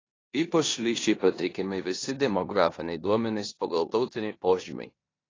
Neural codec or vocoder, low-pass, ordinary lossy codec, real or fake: codec, 16 kHz in and 24 kHz out, 0.9 kbps, LongCat-Audio-Codec, four codebook decoder; 7.2 kHz; AAC, 32 kbps; fake